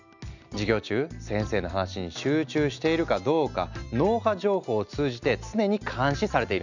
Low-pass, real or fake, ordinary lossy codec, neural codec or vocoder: 7.2 kHz; real; none; none